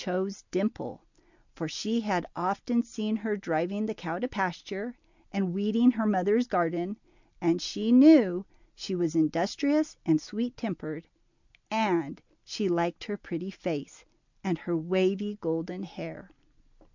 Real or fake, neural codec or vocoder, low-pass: real; none; 7.2 kHz